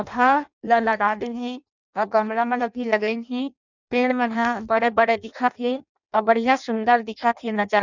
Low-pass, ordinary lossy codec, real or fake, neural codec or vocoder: 7.2 kHz; none; fake; codec, 16 kHz in and 24 kHz out, 0.6 kbps, FireRedTTS-2 codec